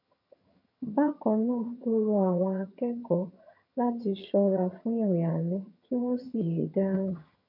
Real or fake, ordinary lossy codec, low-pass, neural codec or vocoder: fake; none; 5.4 kHz; vocoder, 22.05 kHz, 80 mel bands, HiFi-GAN